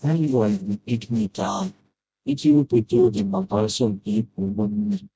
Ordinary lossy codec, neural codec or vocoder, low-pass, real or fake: none; codec, 16 kHz, 0.5 kbps, FreqCodec, smaller model; none; fake